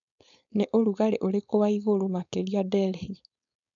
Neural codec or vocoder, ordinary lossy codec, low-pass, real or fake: codec, 16 kHz, 4.8 kbps, FACodec; none; 7.2 kHz; fake